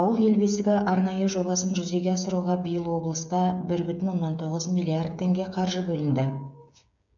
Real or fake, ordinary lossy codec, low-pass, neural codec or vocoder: fake; none; 7.2 kHz; codec, 16 kHz, 8 kbps, FreqCodec, smaller model